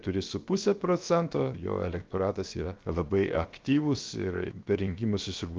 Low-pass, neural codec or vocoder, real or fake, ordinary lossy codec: 7.2 kHz; codec, 16 kHz, 0.7 kbps, FocalCodec; fake; Opus, 24 kbps